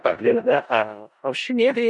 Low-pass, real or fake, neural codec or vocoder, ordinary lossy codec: 10.8 kHz; fake; codec, 16 kHz in and 24 kHz out, 0.4 kbps, LongCat-Audio-Codec, four codebook decoder; Opus, 64 kbps